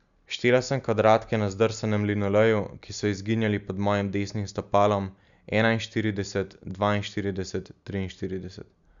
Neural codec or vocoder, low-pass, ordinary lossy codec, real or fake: none; 7.2 kHz; none; real